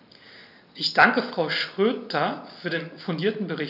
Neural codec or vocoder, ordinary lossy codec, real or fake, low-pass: none; none; real; 5.4 kHz